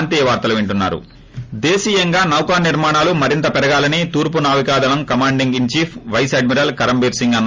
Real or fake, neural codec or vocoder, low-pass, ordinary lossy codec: real; none; 7.2 kHz; Opus, 32 kbps